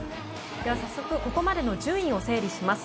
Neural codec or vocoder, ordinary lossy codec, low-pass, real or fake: none; none; none; real